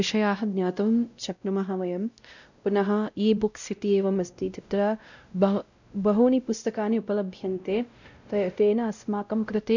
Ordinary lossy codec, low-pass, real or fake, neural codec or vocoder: none; 7.2 kHz; fake; codec, 16 kHz, 0.5 kbps, X-Codec, WavLM features, trained on Multilingual LibriSpeech